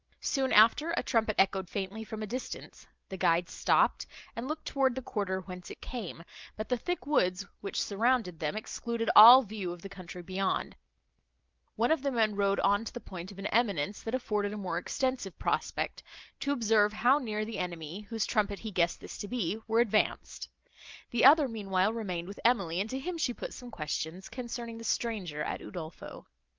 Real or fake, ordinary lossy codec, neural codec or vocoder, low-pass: real; Opus, 24 kbps; none; 7.2 kHz